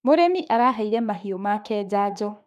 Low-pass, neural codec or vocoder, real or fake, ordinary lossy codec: 14.4 kHz; autoencoder, 48 kHz, 32 numbers a frame, DAC-VAE, trained on Japanese speech; fake; none